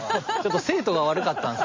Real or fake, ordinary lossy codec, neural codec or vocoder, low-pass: real; MP3, 32 kbps; none; 7.2 kHz